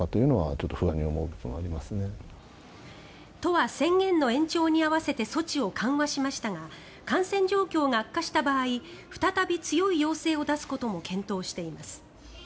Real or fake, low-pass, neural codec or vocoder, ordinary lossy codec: real; none; none; none